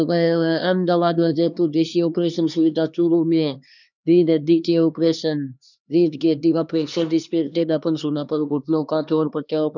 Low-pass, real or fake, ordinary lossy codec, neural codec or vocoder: 7.2 kHz; fake; none; codec, 16 kHz, 2 kbps, X-Codec, HuBERT features, trained on LibriSpeech